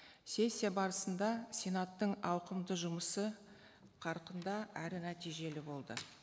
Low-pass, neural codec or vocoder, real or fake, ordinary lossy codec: none; none; real; none